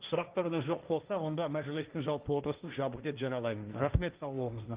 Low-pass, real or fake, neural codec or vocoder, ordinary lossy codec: 3.6 kHz; fake; codec, 16 kHz, 1.1 kbps, Voila-Tokenizer; Opus, 32 kbps